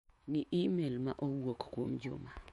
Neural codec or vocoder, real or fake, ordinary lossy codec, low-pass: autoencoder, 48 kHz, 128 numbers a frame, DAC-VAE, trained on Japanese speech; fake; MP3, 48 kbps; 19.8 kHz